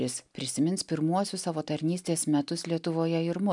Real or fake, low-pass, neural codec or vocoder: real; 10.8 kHz; none